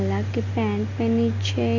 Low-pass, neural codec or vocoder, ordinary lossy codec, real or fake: 7.2 kHz; none; none; real